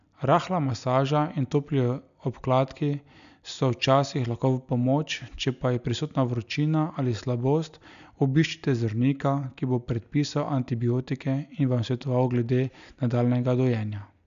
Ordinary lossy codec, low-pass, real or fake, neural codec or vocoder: none; 7.2 kHz; real; none